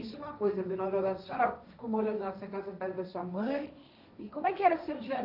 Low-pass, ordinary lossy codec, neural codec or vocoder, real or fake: 5.4 kHz; none; codec, 16 kHz, 1.1 kbps, Voila-Tokenizer; fake